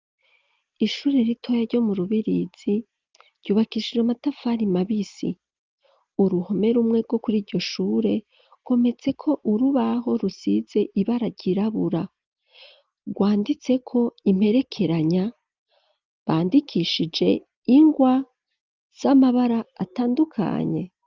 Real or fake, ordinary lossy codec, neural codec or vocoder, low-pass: real; Opus, 24 kbps; none; 7.2 kHz